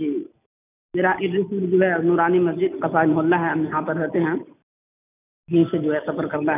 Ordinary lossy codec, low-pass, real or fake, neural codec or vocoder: none; 3.6 kHz; real; none